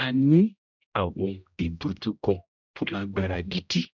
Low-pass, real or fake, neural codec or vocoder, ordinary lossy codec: 7.2 kHz; fake; codec, 16 kHz, 0.5 kbps, X-Codec, HuBERT features, trained on general audio; none